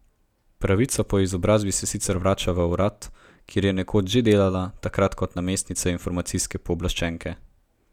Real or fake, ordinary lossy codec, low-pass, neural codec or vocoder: real; none; 19.8 kHz; none